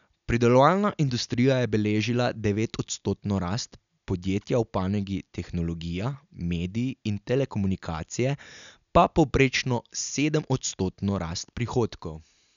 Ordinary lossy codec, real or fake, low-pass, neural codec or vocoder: none; real; 7.2 kHz; none